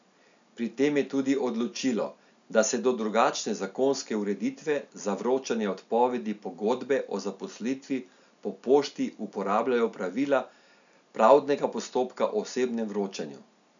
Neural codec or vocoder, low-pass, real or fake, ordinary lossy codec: none; 7.2 kHz; real; none